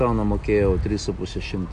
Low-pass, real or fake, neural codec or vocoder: 9.9 kHz; real; none